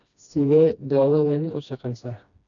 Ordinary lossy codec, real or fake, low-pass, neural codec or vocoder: none; fake; 7.2 kHz; codec, 16 kHz, 1 kbps, FreqCodec, smaller model